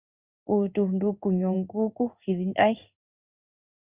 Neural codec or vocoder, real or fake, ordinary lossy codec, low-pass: codec, 16 kHz in and 24 kHz out, 1 kbps, XY-Tokenizer; fake; Opus, 64 kbps; 3.6 kHz